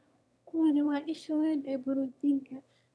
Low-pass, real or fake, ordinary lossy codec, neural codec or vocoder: none; fake; none; autoencoder, 22.05 kHz, a latent of 192 numbers a frame, VITS, trained on one speaker